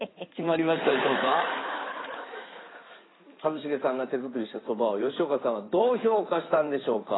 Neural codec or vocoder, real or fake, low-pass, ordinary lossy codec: codec, 16 kHz in and 24 kHz out, 2.2 kbps, FireRedTTS-2 codec; fake; 7.2 kHz; AAC, 16 kbps